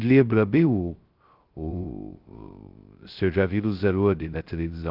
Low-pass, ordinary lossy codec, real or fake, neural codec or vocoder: 5.4 kHz; Opus, 32 kbps; fake; codec, 16 kHz, 0.2 kbps, FocalCodec